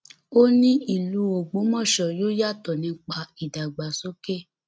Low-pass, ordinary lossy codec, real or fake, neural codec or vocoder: none; none; real; none